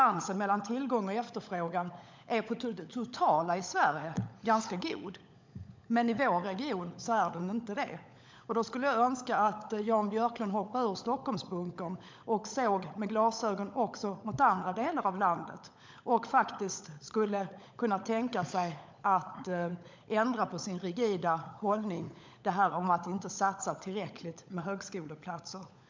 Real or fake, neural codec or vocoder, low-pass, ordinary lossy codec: fake; codec, 16 kHz, 16 kbps, FunCodec, trained on LibriTTS, 50 frames a second; 7.2 kHz; MP3, 64 kbps